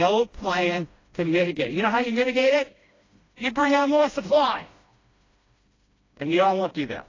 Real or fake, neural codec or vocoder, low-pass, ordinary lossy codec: fake; codec, 16 kHz, 1 kbps, FreqCodec, smaller model; 7.2 kHz; AAC, 32 kbps